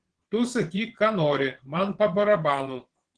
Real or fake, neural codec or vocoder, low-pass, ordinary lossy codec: fake; vocoder, 22.05 kHz, 80 mel bands, WaveNeXt; 9.9 kHz; Opus, 16 kbps